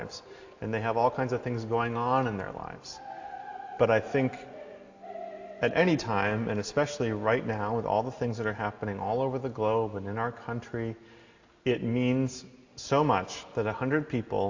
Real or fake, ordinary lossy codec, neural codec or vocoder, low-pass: real; AAC, 48 kbps; none; 7.2 kHz